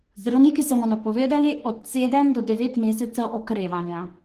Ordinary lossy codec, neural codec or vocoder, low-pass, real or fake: Opus, 16 kbps; codec, 44.1 kHz, 3.4 kbps, Pupu-Codec; 14.4 kHz; fake